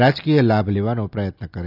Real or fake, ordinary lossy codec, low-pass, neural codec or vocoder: real; none; 5.4 kHz; none